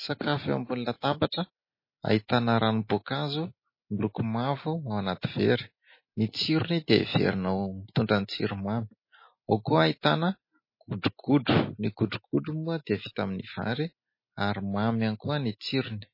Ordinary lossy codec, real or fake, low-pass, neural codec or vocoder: MP3, 24 kbps; real; 5.4 kHz; none